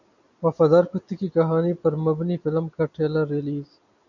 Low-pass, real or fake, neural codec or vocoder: 7.2 kHz; real; none